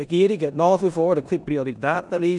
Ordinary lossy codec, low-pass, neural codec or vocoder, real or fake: none; 10.8 kHz; codec, 16 kHz in and 24 kHz out, 0.9 kbps, LongCat-Audio-Codec, four codebook decoder; fake